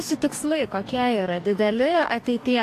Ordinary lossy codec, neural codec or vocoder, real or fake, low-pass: AAC, 64 kbps; codec, 44.1 kHz, 2.6 kbps, DAC; fake; 14.4 kHz